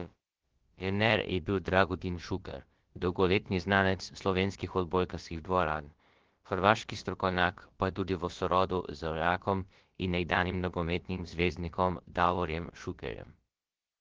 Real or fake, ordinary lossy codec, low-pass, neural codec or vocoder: fake; Opus, 16 kbps; 7.2 kHz; codec, 16 kHz, about 1 kbps, DyCAST, with the encoder's durations